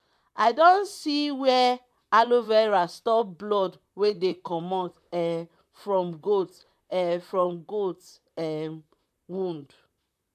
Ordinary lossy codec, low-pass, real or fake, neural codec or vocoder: MP3, 96 kbps; 14.4 kHz; fake; vocoder, 44.1 kHz, 128 mel bands, Pupu-Vocoder